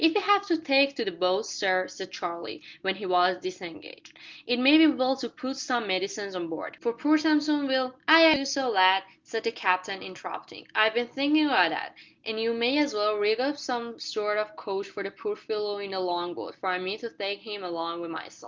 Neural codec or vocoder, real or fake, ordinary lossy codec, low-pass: none; real; Opus, 32 kbps; 7.2 kHz